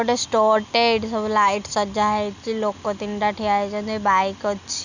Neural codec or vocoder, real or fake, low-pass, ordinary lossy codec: none; real; 7.2 kHz; none